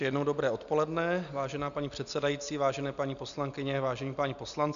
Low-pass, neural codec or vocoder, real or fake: 7.2 kHz; none; real